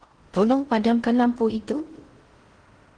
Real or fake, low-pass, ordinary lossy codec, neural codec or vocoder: fake; 9.9 kHz; Opus, 16 kbps; codec, 16 kHz in and 24 kHz out, 0.6 kbps, FocalCodec, streaming, 4096 codes